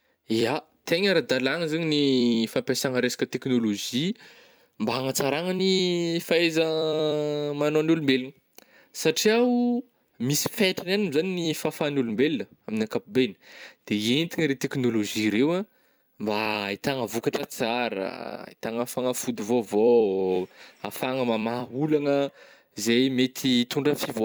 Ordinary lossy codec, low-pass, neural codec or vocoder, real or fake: none; none; vocoder, 44.1 kHz, 128 mel bands every 256 samples, BigVGAN v2; fake